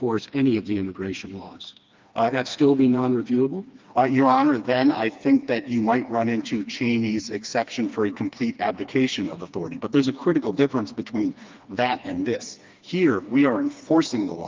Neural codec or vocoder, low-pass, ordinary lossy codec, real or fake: codec, 16 kHz, 2 kbps, FreqCodec, smaller model; 7.2 kHz; Opus, 24 kbps; fake